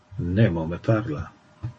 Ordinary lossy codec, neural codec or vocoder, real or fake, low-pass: MP3, 32 kbps; none; real; 9.9 kHz